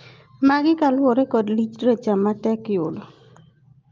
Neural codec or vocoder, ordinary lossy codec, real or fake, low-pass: none; Opus, 24 kbps; real; 7.2 kHz